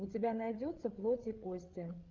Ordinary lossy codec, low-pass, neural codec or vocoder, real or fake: Opus, 32 kbps; 7.2 kHz; codec, 16 kHz, 8 kbps, FreqCodec, larger model; fake